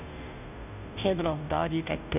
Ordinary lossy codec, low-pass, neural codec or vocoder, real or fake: none; 3.6 kHz; codec, 16 kHz, 0.5 kbps, FunCodec, trained on Chinese and English, 25 frames a second; fake